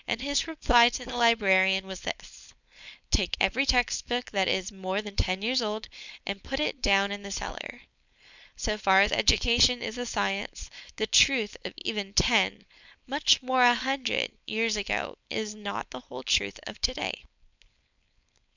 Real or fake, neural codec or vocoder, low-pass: fake; codec, 16 kHz, 4.8 kbps, FACodec; 7.2 kHz